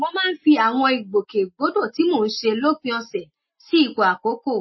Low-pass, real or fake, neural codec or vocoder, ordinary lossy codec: 7.2 kHz; real; none; MP3, 24 kbps